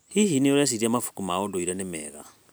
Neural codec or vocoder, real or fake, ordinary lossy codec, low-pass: none; real; none; none